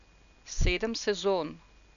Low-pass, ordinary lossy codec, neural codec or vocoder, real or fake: 7.2 kHz; none; none; real